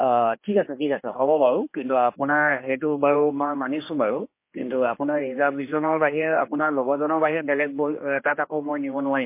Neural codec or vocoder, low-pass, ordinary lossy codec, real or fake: codec, 16 kHz, 2 kbps, X-Codec, HuBERT features, trained on general audio; 3.6 kHz; MP3, 24 kbps; fake